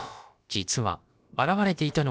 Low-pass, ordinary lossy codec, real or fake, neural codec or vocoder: none; none; fake; codec, 16 kHz, about 1 kbps, DyCAST, with the encoder's durations